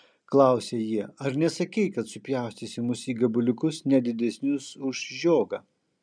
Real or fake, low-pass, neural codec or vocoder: real; 9.9 kHz; none